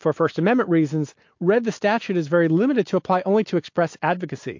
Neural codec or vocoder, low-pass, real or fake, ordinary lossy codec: none; 7.2 kHz; real; MP3, 48 kbps